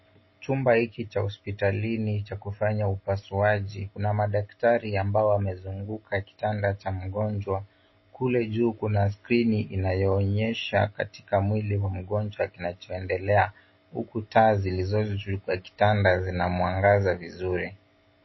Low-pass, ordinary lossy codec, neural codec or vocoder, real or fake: 7.2 kHz; MP3, 24 kbps; none; real